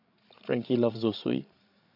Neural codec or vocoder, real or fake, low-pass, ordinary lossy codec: codec, 16 kHz, 16 kbps, FreqCodec, larger model; fake; 5.4 kHz; none